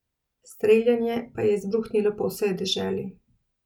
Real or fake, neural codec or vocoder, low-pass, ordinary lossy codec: real; none; 19.8 kHz; none